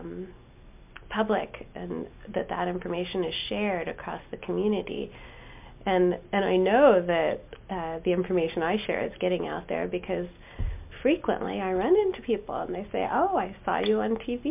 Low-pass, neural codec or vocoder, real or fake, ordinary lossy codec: 3.6 kHz; none; real; MP3, 32 kbps